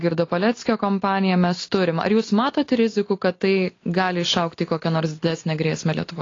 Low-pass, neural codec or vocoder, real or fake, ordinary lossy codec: 7.2 kHz; none; real; AAC, 32 kbps